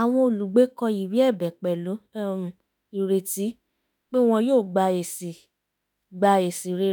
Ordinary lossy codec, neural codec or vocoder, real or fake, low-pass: none; autoencoder, 48 kHz, 32 numbers a frame, DAC-VAE, trained on Japanese speech; fake; none